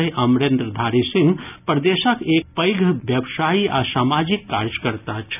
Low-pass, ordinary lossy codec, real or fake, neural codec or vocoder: 3.6 kHz; none; real; none